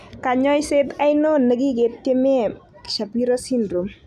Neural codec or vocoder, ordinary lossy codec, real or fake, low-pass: none; none; real; 14.4 kHz